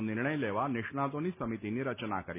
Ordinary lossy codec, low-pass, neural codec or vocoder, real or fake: none; 3.6 kHz; none; real